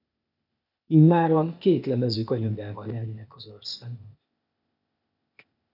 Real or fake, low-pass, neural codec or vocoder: fake; 5.4 kHz; codec, 16 kHz, 0.8 kbps, ZipCodec